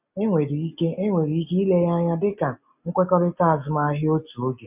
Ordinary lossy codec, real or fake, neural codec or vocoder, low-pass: none; real; none; 3.6 kHz